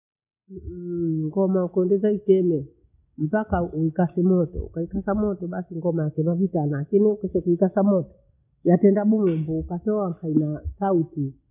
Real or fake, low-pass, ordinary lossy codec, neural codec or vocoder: real; 3.6 kHz; none; none